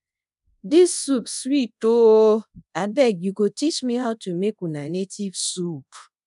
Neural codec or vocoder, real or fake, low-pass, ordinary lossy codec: codec, 24 kHz, 0.5 kbps, DualCodec; fake; 10.8 kHz; none